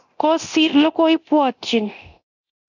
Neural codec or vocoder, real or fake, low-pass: codec, 24 kHz, 0.9 kbps, DualCodec; fake; 7.2 kHz